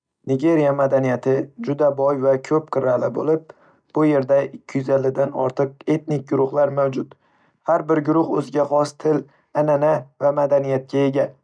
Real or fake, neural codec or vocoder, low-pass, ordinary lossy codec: real; none; 9.9 kHz; none